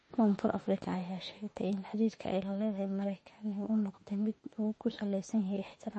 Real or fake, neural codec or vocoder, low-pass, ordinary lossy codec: fake; autoencoder, 48 kHz, 32 numbers a frame, DAC-VAE, trained on Japanese speech; 10.8 kHz; MP3, 32 kbps